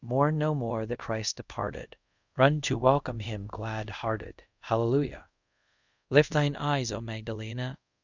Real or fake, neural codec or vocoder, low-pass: fake; codec, 24 kHz, 0.5 kbps, DualCodec; 7.2 kHz